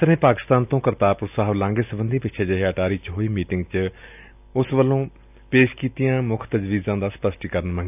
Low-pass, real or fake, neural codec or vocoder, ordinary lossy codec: 3.6 kHz; real; none; none